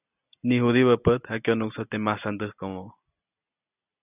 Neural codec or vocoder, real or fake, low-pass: none; real; 3.6 kHz